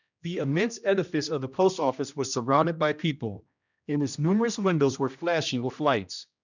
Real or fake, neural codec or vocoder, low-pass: fake; codec, 16 kHz, 1 kbps, X-Codec, HuBERT features, trained on general audio; 7.2 kHz